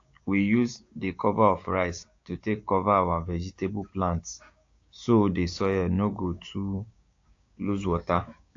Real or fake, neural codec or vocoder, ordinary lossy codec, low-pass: fake; codec, 16 kHz, 6 kbps, DAC; AAC, 48 kbps; 7.2 kHz